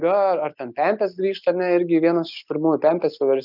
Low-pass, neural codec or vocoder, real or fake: 5.4 kHz; none; real